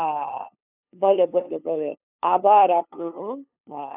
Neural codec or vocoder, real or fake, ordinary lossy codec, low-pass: codec, 16 kHz, 2 kbps, FunCodec, trained on LibriTTS, 25 frames a second; fake; none; 3.6 kHz